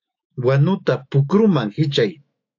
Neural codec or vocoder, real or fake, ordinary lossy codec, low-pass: autoencoder, 48 kHz, 128 numbers a frame, DAC-VAE, trained on Japanese speech; fake; AAC, 48 kbps; 7.2 kHz